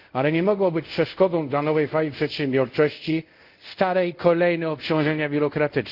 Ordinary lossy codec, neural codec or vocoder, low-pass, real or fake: Opus, 32 kbps; codec, 24 kHz, 0.5 kbps, DualCodec; 5.4 kHz; fake